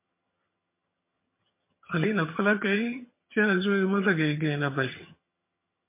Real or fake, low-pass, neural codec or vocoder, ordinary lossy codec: fake; 3.6 kHz; vocoder, 22.05 kHz, 80 mel bands, HiFi-GAN; MP3, 24 kbps